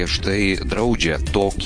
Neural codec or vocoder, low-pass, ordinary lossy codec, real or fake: vocoder, 22.05 kHz, 80 mel bands, Vocos; 9.9 kHz; MP3, 48 kbps; fake